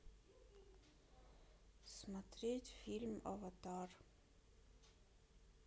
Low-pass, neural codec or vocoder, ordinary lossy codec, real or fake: none; none; none; real